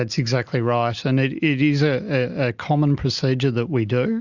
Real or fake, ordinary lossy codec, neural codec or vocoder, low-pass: real; Opus, 64 kbps; none; 7.2 kHz